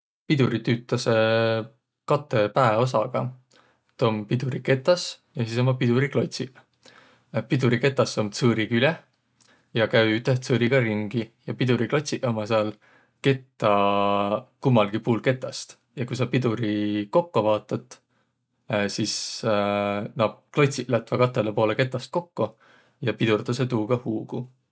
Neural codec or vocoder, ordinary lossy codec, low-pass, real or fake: none; none; none; real